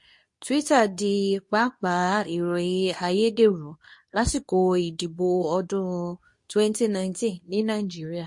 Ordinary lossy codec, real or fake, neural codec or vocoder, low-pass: MP3, 48 kbps; fake; codec, 24 kHz, 0.9 kbps, WavTokenizer, medium speech release version 2; 10.8 kHz